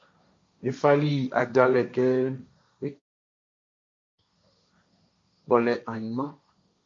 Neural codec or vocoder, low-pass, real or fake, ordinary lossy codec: codec, 16 kHz, 1.1 kbps, Voila-Tokenizer; 7.2 kHz; fake; MP3, 48 kbps